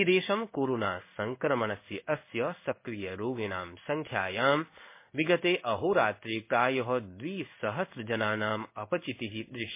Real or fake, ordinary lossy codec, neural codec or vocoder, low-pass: fake; MP3, 24 kbps; codec, 16 kHz in and 24 kHz out, 1 kbps, XY-Tokenizer; 3.6 kHz